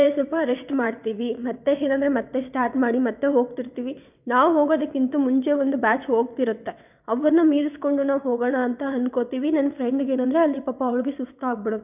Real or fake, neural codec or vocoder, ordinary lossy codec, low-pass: fake; vocoder, 22.05 kHz, 80 mel bands, WaveNeXt; none; 3.6 kHz